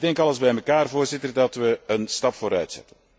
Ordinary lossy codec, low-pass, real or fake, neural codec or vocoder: none; none; real; none